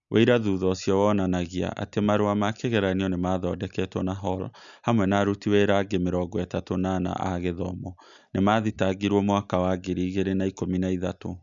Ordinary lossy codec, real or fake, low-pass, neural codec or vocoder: none; real; 7.2 kHz; none